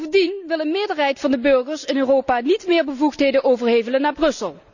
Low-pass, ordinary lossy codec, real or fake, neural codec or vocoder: 7.2 kHz; none; real; none